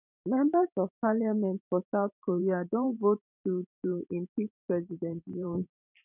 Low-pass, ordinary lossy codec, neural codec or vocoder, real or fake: 3.6 kHz; none; vocoder, 44.1 kHz, 128 mel bands every 512 samples, BigVGAN v2; fake